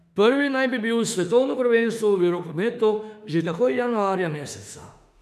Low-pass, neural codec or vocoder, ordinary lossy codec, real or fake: 14.4 kHz; autoencoder, 48 kHz, 32 numbers a frame, DAC-VAE, trained on Japanese speech; none; fake